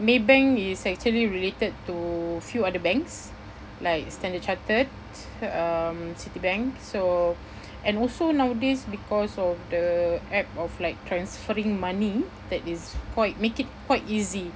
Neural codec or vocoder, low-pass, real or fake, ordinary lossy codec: none; none; real; none